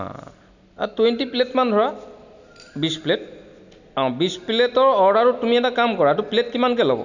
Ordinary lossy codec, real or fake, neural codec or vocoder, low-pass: none; real; none; 7.2 kHz